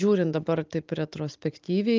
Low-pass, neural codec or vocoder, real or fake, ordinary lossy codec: 7.2 kHz; none; real; Opus, 32 kbps